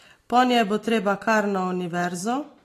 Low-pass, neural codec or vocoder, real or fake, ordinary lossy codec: 14.4 kHz; none; real; AAC, 48 kbps